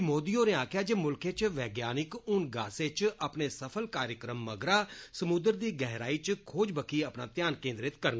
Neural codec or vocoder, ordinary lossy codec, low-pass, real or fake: none; none; none; real